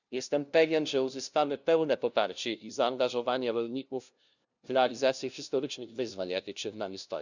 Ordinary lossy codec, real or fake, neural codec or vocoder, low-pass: none; fake; codec, 16 kHz, 0.5 kbps, FunCodec, trained on LibriTTS, 25 frames a second; 7.2 kHz